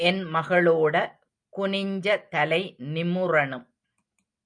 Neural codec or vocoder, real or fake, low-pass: none; real; 9.9 kHz